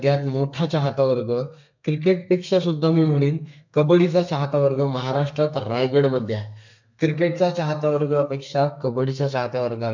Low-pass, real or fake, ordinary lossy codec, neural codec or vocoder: 7.2 kHz; fake; MP3, 64 kbps; codec, 32 kHz, 1.9 kbps, SNAC